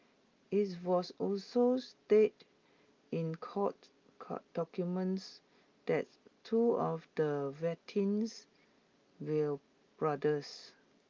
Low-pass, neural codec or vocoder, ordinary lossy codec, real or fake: 7.2 kHz; none; Opus, 24 kbps; real